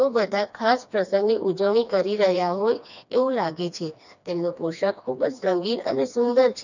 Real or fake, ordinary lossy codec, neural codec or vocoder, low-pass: fake; none; codec, 16 kHz, 2 kbps, FreqCodec, smaller model; 7.2 kHz